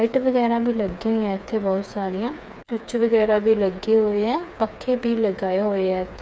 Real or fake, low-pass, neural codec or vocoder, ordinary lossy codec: fake; none; codec, 16 kHz, 4 kbps, FreqCodec, smaller model; none